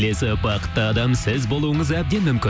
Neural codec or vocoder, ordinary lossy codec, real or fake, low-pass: none; none; real; none